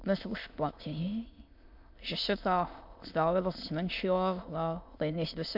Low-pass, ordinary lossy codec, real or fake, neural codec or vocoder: 5.4 kHz; MP3, 48 kbps; fake; autoencoder, 22.05 kHz, a latent of 192 numbers a frame, VITS, trained on many speakers